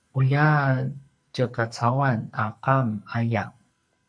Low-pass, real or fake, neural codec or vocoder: 9.9 kHz; fake; codec, 44.1 kHz, 2.6 kbps, SNAC